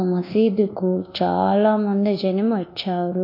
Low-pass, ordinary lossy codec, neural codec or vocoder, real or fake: 5.4 kHz; AAC, 32 kbps; codec, 24 kHz, 1.2 kbps, DualCodec; fake